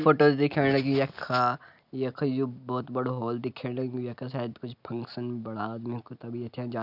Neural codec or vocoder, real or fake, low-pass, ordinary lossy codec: none; real; 5.4 kHz; none